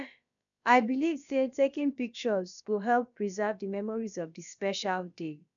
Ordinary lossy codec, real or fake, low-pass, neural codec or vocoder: none; fake; 7.2 kHz; codec, 16 kHz, about 1 kbps, DyCAST, with the encoder's durations